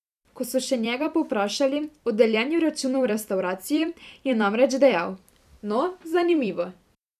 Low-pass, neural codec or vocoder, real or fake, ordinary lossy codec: 14.4 kHz; vocoder, 44.1 kHz, 128 mel bands every 256 samples, BigVGAN v2; fake; none